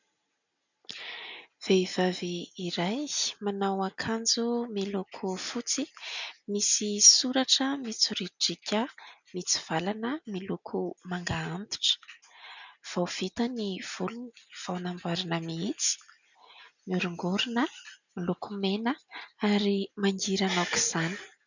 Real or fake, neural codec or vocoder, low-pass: real; none; 7.2 kHz